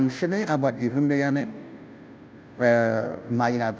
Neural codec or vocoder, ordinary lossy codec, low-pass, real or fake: codec, 16 kHz, 0.5 kbps, FunCodec, trained on Chinese and English, 25 frames a second; none; none; fake